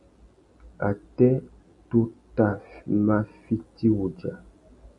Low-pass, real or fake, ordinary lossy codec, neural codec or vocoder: 10.8 kHz; real; MP3, 96 kbps; none